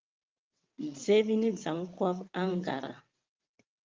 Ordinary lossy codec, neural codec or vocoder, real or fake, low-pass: Opus, 24 kbps; vocoder, 22.05 kHz, 80 mel bands, WaveNeXt; fake; 7.2 kHz